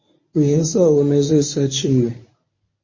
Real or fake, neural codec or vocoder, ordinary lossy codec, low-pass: fake; codec, 24 kHz, 0.9 kbps, WavTokenizer, medium speech release version 1; MP3, 32 kbps; 7.2 kHz